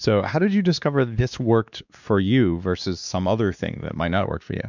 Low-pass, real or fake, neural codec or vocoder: 7.2 kHz; fake; codec, 16 kHz, 2 kbps, X-Codec, WavLM features, trained on Multilingual LibriSpeech